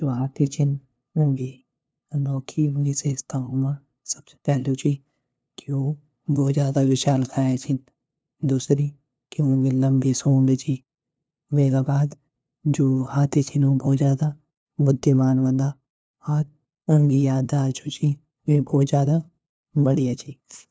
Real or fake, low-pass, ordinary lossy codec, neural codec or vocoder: fake; none; none; codec, 16 kHz, 2 kbps, FunCodec, trained on LibriTTS, 25 frames a second